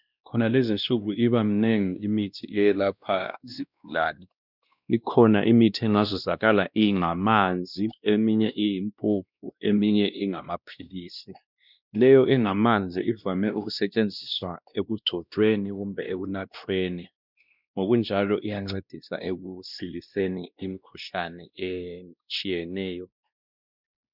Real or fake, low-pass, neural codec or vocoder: fake; 5.4 kHz; codec, 16 kHz, 1 kbps, X-Codec, WavLM features, trained on Multilingual LibriSpeech